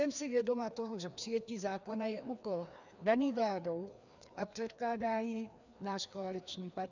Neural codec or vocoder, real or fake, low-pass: codec, 16 kHz, 2 kbps, FreqCodec, larger model; fake; 7.2 kHz